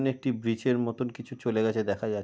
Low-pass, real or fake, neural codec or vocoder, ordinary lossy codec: none; real; none; none